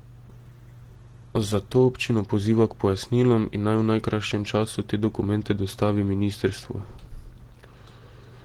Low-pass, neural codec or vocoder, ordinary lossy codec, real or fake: 19.8 kHz; none; Opus, 16 kbps; real